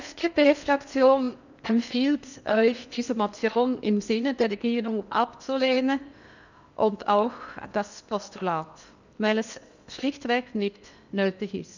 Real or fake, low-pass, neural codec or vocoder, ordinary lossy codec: fake; 7.2 kHz; codec, 16 kHz in and 24 kHz out, 0.8 kbps, FocalCodec, streaming, 65536 codes; none